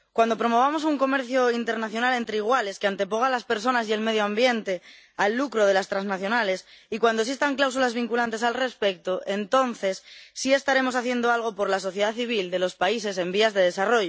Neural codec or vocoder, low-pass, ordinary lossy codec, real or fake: none; none; none; real